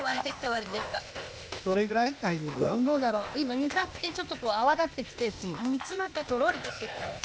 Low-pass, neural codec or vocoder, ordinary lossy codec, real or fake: none; codec, 16 kHz, 0.8 kbps, ZipCodec; none; fake